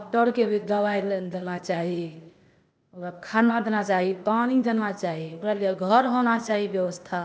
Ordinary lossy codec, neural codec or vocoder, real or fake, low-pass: none; codec, 16 kHz, 0.8 kbps, ZipCodec; fake; none